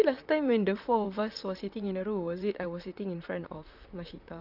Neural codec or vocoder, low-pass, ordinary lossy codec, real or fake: vocoder, 44.1 kHz, 128 mel bands, Pupu-Vocoder; 5.4 kHz; none; fake